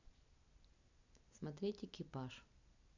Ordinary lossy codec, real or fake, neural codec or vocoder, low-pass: none; real; none; 7.2 kHz